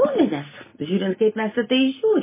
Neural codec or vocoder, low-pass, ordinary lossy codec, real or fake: none; 3.6 kHz; MP3, 16 kbps; real